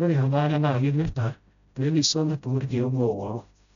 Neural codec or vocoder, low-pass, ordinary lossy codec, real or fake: codec, 16 kHz, 0.5 kbps, FreqCodec, smaller model; 7.2 kHz; none; fake